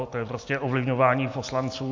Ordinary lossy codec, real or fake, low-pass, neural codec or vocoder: MP3, 48 kbps; real; 7.2 kHz; none